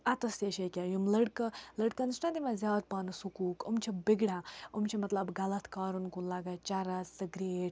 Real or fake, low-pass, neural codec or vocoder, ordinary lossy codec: real; none; none; none